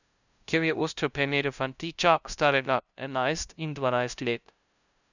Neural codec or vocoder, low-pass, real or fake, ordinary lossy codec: codec, 16 kHz, 0.5 kbps, FunCodec, trained on LibriTTS, 25 frames a second; 7.2 kHz; fake; none